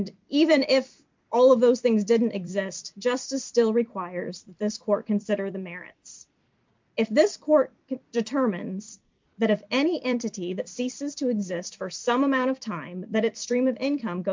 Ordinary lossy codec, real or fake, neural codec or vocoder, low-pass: MP3, 64 kbps; fake; vocoder, 44.1 kHz, 128 mel bands every 256 samples, BigVGAN v2; 7.2 kHz